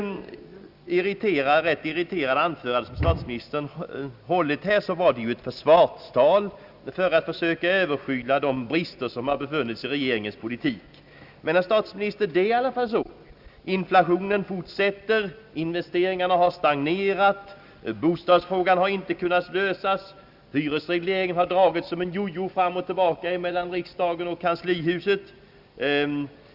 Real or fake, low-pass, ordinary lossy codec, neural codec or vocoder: real; 5.4 kHz; Opus, 64 kbps; none